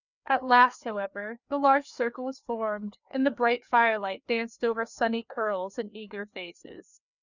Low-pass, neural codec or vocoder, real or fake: 7.2 kHz; codec, 16 kHz, 2 kbps, FreqCodec, larger model; fake